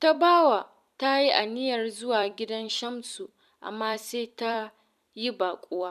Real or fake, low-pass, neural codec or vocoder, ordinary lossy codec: fake; 14.4 kHz; vocoder, 44.1 kHz, 128 mel bands every 256 samples, BigVGAN v2; none